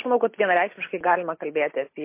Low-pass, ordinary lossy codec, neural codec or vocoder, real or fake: 3.6 kHz; MP3, 24 kbps; none; real